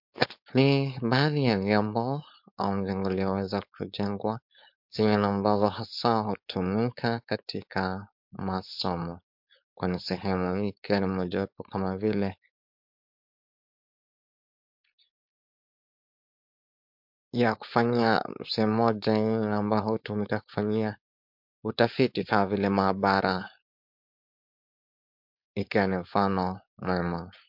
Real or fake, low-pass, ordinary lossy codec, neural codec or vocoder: fake; 5.4 kHz; MP3, 48 kbps; codec, 16 kHz, 4.8 kbps, FACodec